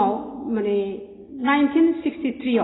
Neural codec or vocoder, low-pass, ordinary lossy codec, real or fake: none; 7.2 kHz; AAC, 16 kbps; real